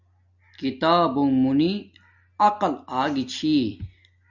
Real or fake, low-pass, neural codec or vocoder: real; 7.2 kHz; none